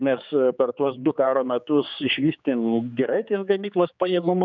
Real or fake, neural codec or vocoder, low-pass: fake; codec, 16 kHz, 4 kbps, X-Codec, HuBERT features, trained on general audio; 7.2 kHz